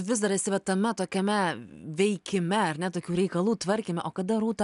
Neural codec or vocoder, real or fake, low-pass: none; real; 10.8 kHz